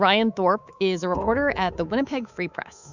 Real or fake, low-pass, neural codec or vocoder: fake; 7.2 kHz; codec, 24 kHz, 3.1 kbps, DualCodec